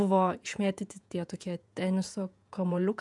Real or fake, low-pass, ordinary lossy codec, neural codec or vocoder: fake; 10.8 kHz; MP3, 96 kbps; vocoder, 48 kHz, 128 mel bands, Vocos